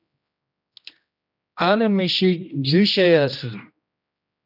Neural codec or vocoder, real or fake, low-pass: codec, 16 kHz, 1 kbps, X-Codec, HuBERT features, trained on general audio; fake; 5.4 kHz